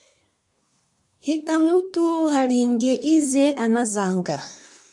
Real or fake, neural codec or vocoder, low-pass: fake; codec, 24 kHz, 1 kbps, SNAC; 10.8 kHz